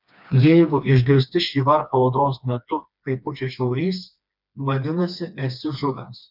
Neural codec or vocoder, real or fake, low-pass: codec, 16 kHz, 2 kbps, FreqCodec, smaller model; fake; 5.4 kHz